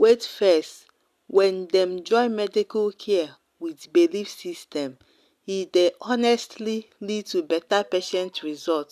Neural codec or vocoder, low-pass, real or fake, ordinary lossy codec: none; 14.4 kHz; real; none